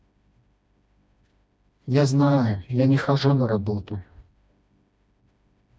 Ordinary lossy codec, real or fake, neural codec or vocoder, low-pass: none; fake; codec, 16 kHz, 1 kbps, FreqCodec, smaller model; none